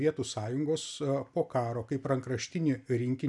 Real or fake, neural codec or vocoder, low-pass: real; none; 10.8 kHz